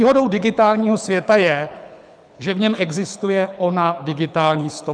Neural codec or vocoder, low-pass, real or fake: codec, 24 kHz, 6 kbps, HILCodec; 9.9 kHz; fake